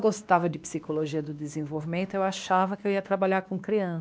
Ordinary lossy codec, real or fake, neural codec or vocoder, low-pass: none; fake; codec, 16 kHz, 2 kbps, X-Codec, WavLM features, trained on Multilingual LibriSpeech; none